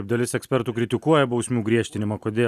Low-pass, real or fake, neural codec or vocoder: 14.4 kHz; real; none